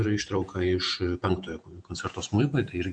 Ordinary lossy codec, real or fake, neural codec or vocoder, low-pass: MP3, 64 kbps; fake; vocoder, 48 kHz, 128 mel bands, Vocos; 9.9 kHz